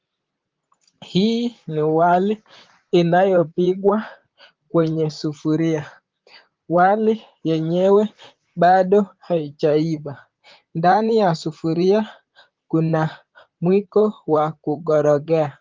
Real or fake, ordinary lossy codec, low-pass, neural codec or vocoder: fake; Opus, 24 kbps; 7.2 kHz; vocoder, 44.1 kHz, 128 mel bands, Pupu-Vocoder